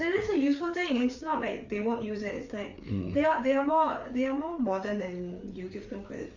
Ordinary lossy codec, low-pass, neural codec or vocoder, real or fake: MP3, 64 kbps; 7.2 kHz; codec, 16 kHz, 4 kbps, FreqCodec, larger model; fake